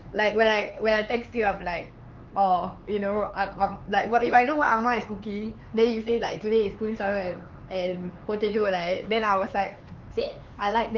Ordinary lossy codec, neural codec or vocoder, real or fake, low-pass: Opus, 24 kbps; codec, 16 kHz, 4 kbps, X-Codec, HuBERT features, trained on LibriSpeech; fake; 7.2 kHz